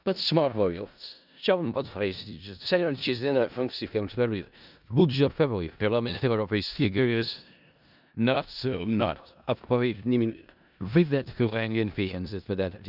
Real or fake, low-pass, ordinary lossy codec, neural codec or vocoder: fake; 5.4 kHz; none; codec, 16 kHz in and 24 kHz out, 0.4 kbps, LongCat-Audio-Codec, four codebook decoder